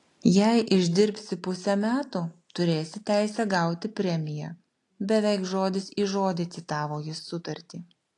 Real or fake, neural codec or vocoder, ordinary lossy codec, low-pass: real; none; AAC, 48 kbps; 10.8 kHz